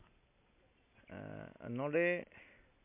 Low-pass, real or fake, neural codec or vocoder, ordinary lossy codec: 3.6 kHz; real; none; MP3, 32 kbps